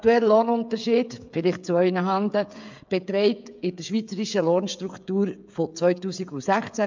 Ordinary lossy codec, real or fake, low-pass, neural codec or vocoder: MP3, 64 kbps; fake; 7.2 kHz; codec, 16 kHz, 16 kbps, FreqCodec, smaller model